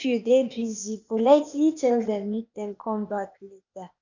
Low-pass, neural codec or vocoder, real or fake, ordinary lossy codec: 7.2 kHz; codec, 16 kHz, 0.8 kbps, ZipCodec; fake; none